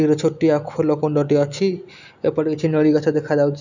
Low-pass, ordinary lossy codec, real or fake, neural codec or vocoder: 7.2 kHz; none; real; none